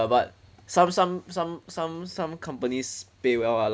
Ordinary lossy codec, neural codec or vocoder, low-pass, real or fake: none; none; none; real